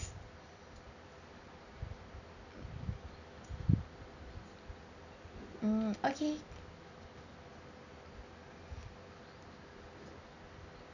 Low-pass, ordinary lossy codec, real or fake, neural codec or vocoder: 7.2 kHz; none; real; none